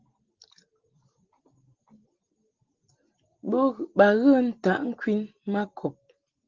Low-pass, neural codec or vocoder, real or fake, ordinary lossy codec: 7.2 kHz; none; real; Opus, 16 kbps